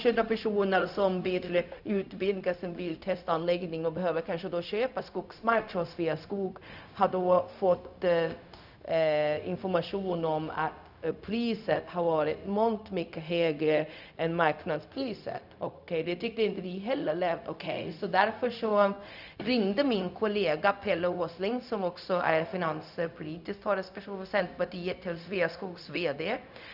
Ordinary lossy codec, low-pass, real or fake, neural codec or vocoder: none; 5.4 kHz; fake; codec, 16 kHz, 0.4 kbps, LongCat-Audio-Codec